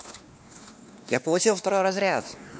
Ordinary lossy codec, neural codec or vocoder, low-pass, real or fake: none; codec, 16 kHz, 2 kbps, X-Codec, WavLM features, trained on Multilingual LibriSpeech; none; fake